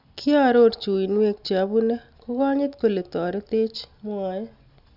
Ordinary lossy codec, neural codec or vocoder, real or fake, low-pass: none; none; real; 5.4 kHz